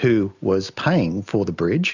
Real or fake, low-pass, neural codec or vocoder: real; 7.2 kHz; none